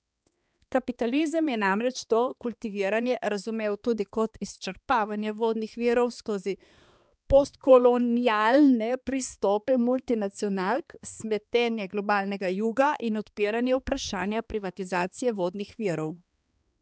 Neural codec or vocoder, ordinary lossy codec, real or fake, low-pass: codec, 16 kHz, 2 kbps, X-Codec, HuBERT features, trained on balanced general audio; none; fake; none